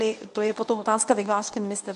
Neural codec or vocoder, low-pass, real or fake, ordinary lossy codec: codec, 24 kHz, 0.9 kbps, WavTokenizer, medium speech release version 1; 10.8 kHz; fake; AAC, 96 kbps